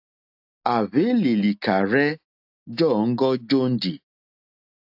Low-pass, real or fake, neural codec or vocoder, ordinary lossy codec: 5.4 kHz; real; none; AAC, 48 kbps